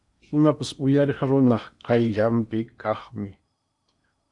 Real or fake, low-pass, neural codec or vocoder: fake; 10.8 kHz; codec, 16 kHz in and 24 kHz out, 0.8 kbps, FocalCodec, streaming, 65536 codes